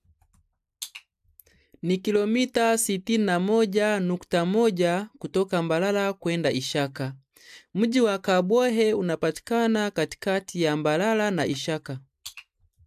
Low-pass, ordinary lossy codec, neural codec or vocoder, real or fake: 14.4 kHz; AAC, 96 kbps; none; real